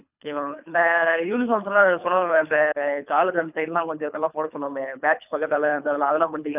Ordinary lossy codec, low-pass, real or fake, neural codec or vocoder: none; 3.6 kHz; fake; codec, 24 kHz, 3 kbps, HILCodec